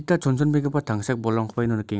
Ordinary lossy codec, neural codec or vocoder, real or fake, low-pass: none; none; real; none